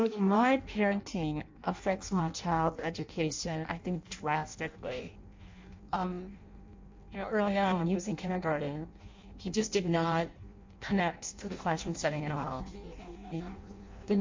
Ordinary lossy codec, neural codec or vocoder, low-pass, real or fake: MP3, 48 kbps; codec, 16 kHz in and 24 kHz out, 0.6 kbps, FireRedTTS-2 codec; 7.2 kHz; fake